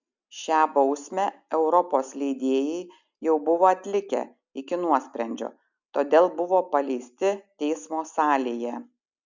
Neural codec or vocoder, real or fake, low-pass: none; real; 7.2 kHz